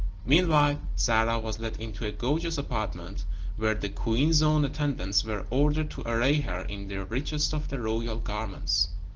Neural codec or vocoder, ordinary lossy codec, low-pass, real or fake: none; Opus, 16 kbps; 7.2 kHz; real